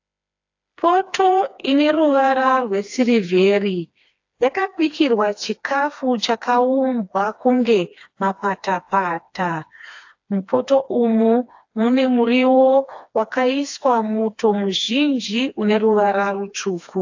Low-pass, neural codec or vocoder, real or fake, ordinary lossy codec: 7.2 kHz; codec, 16 kHz, 2 kbps, FreqCodec, smaller model; fake; AAC, 48 kbps